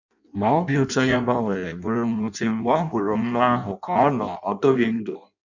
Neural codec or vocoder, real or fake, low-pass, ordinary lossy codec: codec, 16 kHz in and 24 kHz out, 0.6 kbps, FireRedTTS-2 codec; fake; 7.2 kHz; none